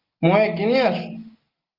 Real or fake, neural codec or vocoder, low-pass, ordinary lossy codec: real; none; 5.4 kHz; Opus, 32 kbps